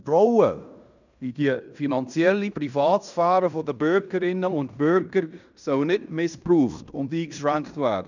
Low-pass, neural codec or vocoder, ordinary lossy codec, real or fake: 7.2 kHz; codec, 16 kHz in and 24 kHz out, 0.9 kbps, LongCat-Audio-Codec, fine tuned four codebook decoder; none; fake